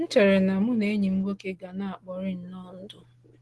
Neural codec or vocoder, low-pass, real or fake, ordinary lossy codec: none; 10.8 kHz; real; Opus, 16 kbps